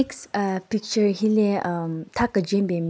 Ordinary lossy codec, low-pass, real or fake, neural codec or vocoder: none; none; real; none